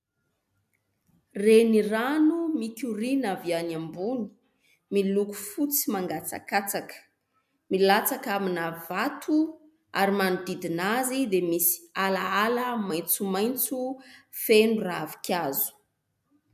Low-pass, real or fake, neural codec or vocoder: 14.4 kHz; real; none